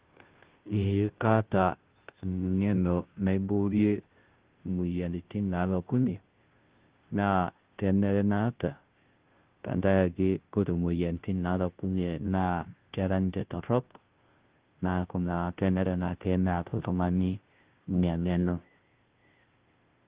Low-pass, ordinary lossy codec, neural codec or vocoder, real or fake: 3.6 kHz; Opus, 16 kbps; codec, 16 kHz, 0.5 kbps, FunCodec, trained on Chinese and English, 25 frames a second; fake